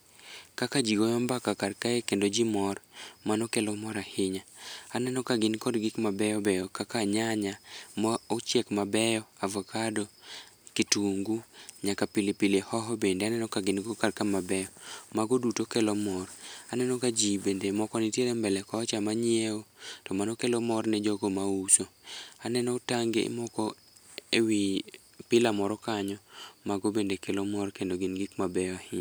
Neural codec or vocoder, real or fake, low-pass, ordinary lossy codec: none; real; none; none